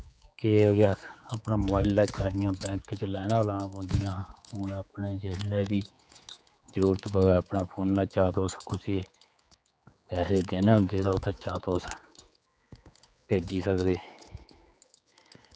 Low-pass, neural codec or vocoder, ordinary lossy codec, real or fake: none; codec, 16 kHz, 4 kbps, X-Codec, HuBERT features, trained on general audio; none; fake